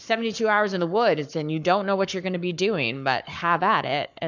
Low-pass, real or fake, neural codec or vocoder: 7.2 kHz; fake; codec, 44.1 kHz, 7.8 kbps, Pupu-Codec